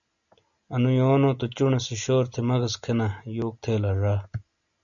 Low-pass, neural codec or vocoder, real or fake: 7.2 kHz; none; real